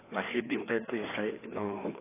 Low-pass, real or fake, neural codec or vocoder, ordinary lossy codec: 3.6 kHz; fake; codec, 16 kHz, 2 kbps, FreqCodec, larger model; AAC, 16 kbps